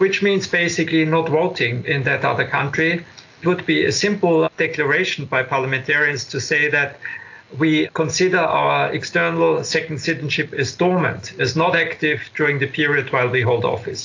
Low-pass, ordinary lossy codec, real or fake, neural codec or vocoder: 7.2 kHz; AAC, 48 kbps; real; none